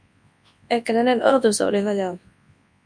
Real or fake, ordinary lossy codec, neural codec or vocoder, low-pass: fake; MP3, 64 kbps; codec, 24 kHz, 0.9 kbps, WavTokenizer, large speech release; 9.9 kHz